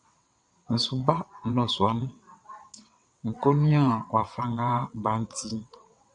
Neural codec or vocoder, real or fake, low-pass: vocoder, 22.05 kHz, 80 mel bands, WaveNeXt; fake; 9.9 kHz